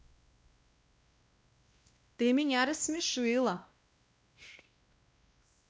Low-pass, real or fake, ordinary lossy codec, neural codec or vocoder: none; fake; none; codec, 16 kHz, 1 kbps, X-Codec, WavLM features, trained on Multilingual LibriSpeech